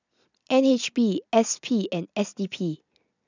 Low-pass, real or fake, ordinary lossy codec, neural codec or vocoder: 7.2 kHz; real; none; none